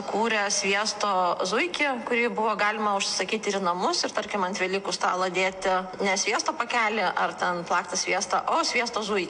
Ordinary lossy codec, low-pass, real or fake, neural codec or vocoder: AAC, 64 kbps; 9.9 kHz; real; none